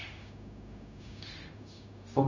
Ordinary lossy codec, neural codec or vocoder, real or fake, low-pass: none; codec, 16 kHz, 0.4 kbps, LongCat-Audio-Codec; fake; 7.2 kHz